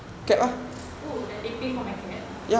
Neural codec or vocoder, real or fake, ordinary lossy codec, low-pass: none; real; none; none